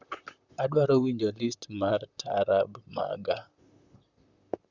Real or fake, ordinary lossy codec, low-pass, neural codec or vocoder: fake; Opus, 64 kbps; 7.2 kHz; vocoder, 44.1 kHz, 128 mel bands, Pupu-Vocoder